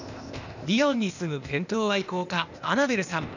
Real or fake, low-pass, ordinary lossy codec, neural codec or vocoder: fake; 7.2 kHz; none; codec, 16 kHz, 0.8 kbps, ZipCodec